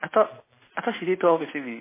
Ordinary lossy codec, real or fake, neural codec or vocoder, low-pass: MP3, 16 kbps; real; none; 3.6 kHz